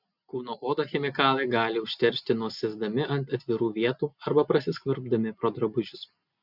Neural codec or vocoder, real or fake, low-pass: none; real; 5.4 kHz